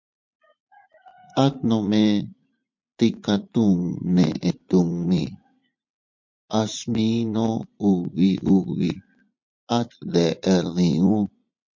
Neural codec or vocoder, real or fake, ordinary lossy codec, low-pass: vocoder, 44.1 kHz, 80 mel bands, Vocos; fake; MP3, 48 kbps; 7.2 kHz